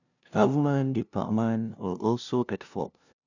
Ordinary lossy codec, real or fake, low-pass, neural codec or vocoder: none; fake; 7.2 kHz; codec, 16 kHz, 0.5 kbps, FunCodec, trained on LibriTTS, 25 frames a second